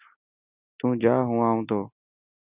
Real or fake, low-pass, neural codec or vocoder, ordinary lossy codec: real; 3.6 kHz; none; Opus, 64 kbps